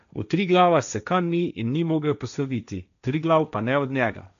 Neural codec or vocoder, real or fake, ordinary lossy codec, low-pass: codec, 16 kHz, 1.1 kbps, Voila-Tokenizer; fake; none; 7.2 kHz